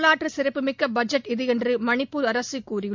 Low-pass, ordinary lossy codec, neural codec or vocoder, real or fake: 7.2 kHz; MP3, 64 kbps; none; real